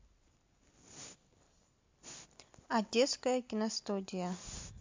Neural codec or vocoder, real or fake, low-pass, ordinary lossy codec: none; real; 7.2 kHz; MP3, 48 kbps